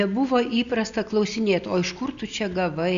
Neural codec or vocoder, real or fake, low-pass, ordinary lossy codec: none; real; 7.2 kHz; Opus, 64 kbps